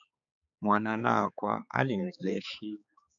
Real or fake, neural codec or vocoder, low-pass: fake; codec, 16 kHz, 4 kbps, X-Codec, HuBERT features, trained on balanced general audio; 7.2 kHz